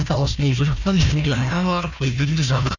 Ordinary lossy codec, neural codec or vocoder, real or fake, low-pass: none; codec, 16 kHz, 1 kbps, FunCodec, trained on Chinese and English, 50 frames a second; fake; 7.2 kHz